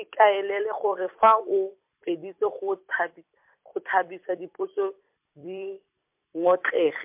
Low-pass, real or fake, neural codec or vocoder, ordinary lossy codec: 3.6 kHz; fake; vocoder, 44.1 kHz, 128 mel bands every 256 samples, BigVGAN v2; MP3, 32 kbps